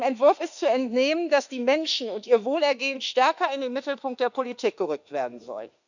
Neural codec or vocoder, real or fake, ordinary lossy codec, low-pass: autoencoder, 48 kHz, 32 numbers a frame, DAC-VAE, trained on Japanese speech; fake; none; 7.2 kHz